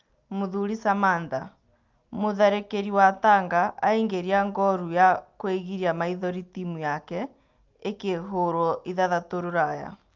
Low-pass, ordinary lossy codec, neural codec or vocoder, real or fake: 7.2 kHz; Opus, 24 kbps; none; real